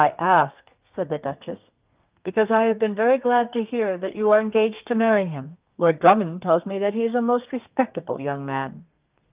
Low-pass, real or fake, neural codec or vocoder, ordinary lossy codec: 3.6 kHz; fake; codec, 32 kHz, 1.9 kbps, SNAC; Opus, 24 kbps